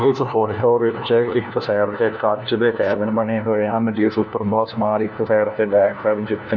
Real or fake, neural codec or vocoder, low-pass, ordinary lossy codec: fake; codec, 16 kHz, 1 kbps, FunCodec, trained on LibriTTS, 50 frames a second; none; none